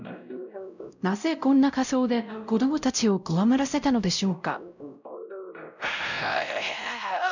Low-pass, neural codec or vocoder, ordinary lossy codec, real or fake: 7.2 kHz; codec, 16 kHz, 0.5 kbps, X-Codec, WavLM features, trained on Multilingual LibriSpeech; none; fake